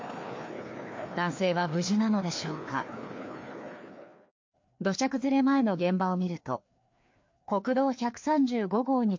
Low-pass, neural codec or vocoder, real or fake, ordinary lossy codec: 7.2 kHz; codec, 16 kHz, 2 kbps, FreqCodec, larger model; fake; MP3, 48 kbps